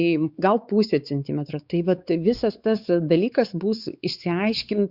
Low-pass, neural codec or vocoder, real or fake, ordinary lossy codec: 5.4 kHz; codec, 16 kHz, 4 kbps, X-Codec, WavLM features, trained on Multilingual LibriSpeech; fake; Opus, 64 kbps